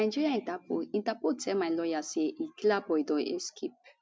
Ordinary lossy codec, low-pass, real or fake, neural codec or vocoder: none; none; real; none